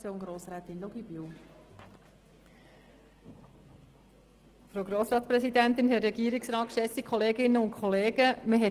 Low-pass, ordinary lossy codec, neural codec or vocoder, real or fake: 14.4 kHz; Opus, 16 kbps; none; real